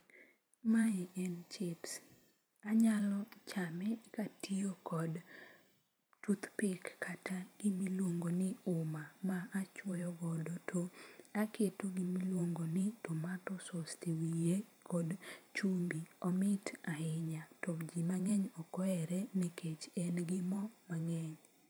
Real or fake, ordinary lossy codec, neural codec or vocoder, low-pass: fake; none; vocoder, 44.1 kHz, 128 mel bands every 512 samples, BigVGAN v2; none